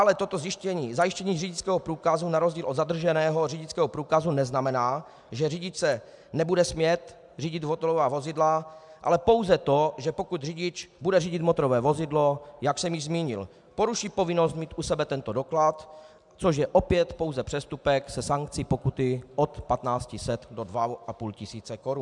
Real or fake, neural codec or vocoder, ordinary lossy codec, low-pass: real; none; MP3, 96 kbps; 10.8 kHz